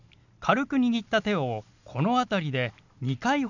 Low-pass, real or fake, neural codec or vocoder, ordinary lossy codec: 7.2 kHz; real; none; none